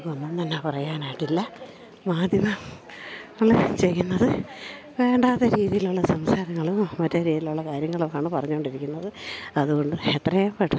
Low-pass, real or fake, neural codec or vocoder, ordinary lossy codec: none; real; none; none